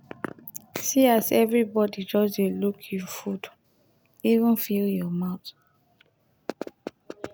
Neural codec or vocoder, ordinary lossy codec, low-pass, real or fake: none; none; none; real